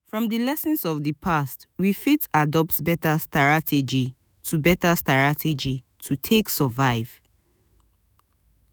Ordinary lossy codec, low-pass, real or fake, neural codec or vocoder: none; none; fake; autoencoder, 48 kHz, 128 numbers a frame, DAC-VAE, trained on Japanese speech